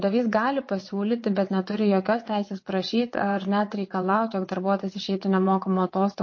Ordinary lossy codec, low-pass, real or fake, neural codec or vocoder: MP3, 32 kbps; 7.2 kHz; fake; vocoder, 22.05 kHz, 80 mel bands, WaveNeXt